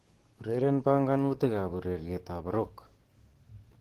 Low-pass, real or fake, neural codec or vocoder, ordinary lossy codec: 14.4 kHz; fake; codec, 44.1 kHz, 7.8 kbps, DAC; Opus, 16 kbps